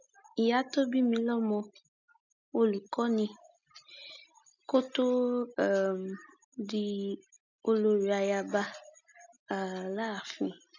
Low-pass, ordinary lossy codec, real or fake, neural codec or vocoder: 7.2 kHz; none; real; none